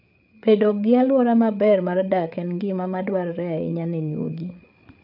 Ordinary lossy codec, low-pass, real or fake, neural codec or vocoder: none; 5.4 kHz; fake; codec, 16 kHz, 8 kbps, FreqCodec, larger model